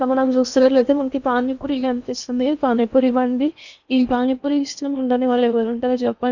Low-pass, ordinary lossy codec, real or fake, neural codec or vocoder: 7.2 kHz; none; fake; codec, 16 kHz in and 24 kHz out, 0.8 kbps, FocalCodec, streaming, 65536 codes